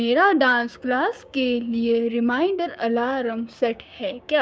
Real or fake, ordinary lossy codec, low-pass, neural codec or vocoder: fake; none; none; codec, 16 kHz, 6 kbps, DAC